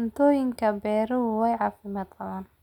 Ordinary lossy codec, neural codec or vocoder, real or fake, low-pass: none; none; real; 19.8 kHz